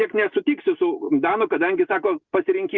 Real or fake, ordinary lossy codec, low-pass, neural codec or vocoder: real; Opus, 64 kbps; 7.2 kHz; none